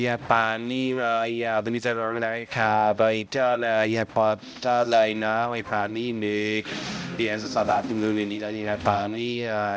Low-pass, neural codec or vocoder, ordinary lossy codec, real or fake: none; codec, 16 kHz, 0.5 kbps, X-Codec, HuBERT features, trained on balanced general audio; none; fake